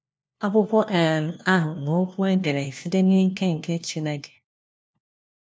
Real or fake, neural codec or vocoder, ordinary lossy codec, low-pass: fake; codec, 16 kHz, 1 kbps, FunCodec, trained on LibriTTS, 50 frames a second; none; none